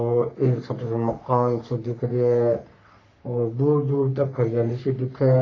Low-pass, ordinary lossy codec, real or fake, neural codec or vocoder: 7.2 kHz; AAC, 32 kbps; fake; codec, 44.1 kHz, 3.4 kbps, Pupu-Codec